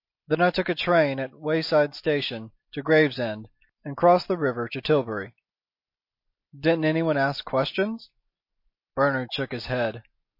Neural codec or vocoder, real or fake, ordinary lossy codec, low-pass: none; real; MP3, 32 kbps; 5.4 kHz